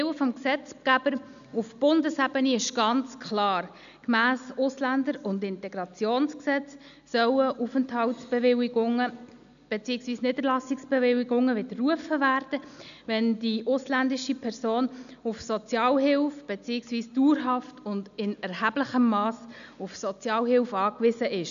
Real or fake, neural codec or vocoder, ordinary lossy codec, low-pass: real; none; none; 7.2 kHz